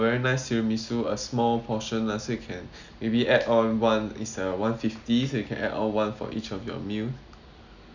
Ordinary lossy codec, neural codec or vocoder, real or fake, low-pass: none; none; real; 7.2 kHz